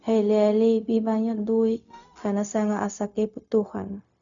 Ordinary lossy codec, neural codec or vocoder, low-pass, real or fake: none; codec, 16 kHz, 0.4 kbps, LongCat-Audio-Codec; 7.2 kHz; fake